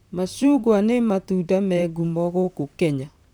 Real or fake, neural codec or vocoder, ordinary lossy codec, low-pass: fake; vocoder, 44.1 kHz, 128 mel bands, Pupu-Vocoder; none; none